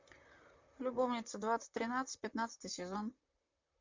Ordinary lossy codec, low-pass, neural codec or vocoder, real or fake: MP3, 64 kbps; 7.2 kHz; vocoder, 44.1 kHz, 128 mel bands, Pupu-Vocoder; fake